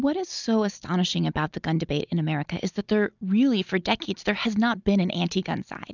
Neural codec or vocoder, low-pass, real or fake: none; 7.2 kHz; real